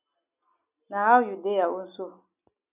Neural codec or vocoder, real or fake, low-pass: none; real; 3.6 kHz